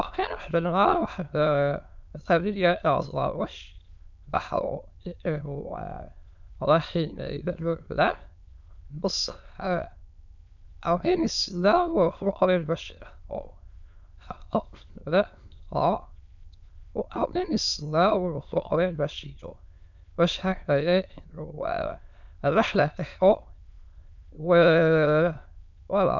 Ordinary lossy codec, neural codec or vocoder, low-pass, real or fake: none; autoencoder, 22.05 kHz, a latent of 192 numbers a frame, VITS, trained on many speakers; 7.2 kHz; fake